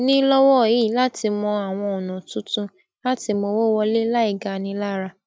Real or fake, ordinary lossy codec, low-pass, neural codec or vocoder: real; none; none; none